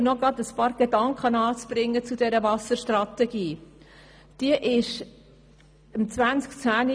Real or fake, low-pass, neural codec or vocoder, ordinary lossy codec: real; none; none; none